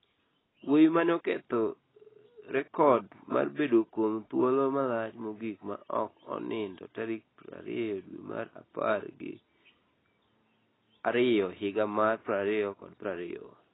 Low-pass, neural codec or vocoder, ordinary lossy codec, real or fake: 7.2 kHz; none; AAC, 16 kbps; real